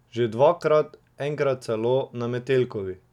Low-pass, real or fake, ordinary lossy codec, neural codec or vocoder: 19.8 kHz; real; none; none